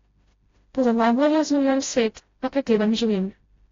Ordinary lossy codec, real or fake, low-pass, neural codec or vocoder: AAC, 32 kbps; fake; 7.2 kHz; codec, 16 kHz, 0.5 kbps, FreqCodec, smaller model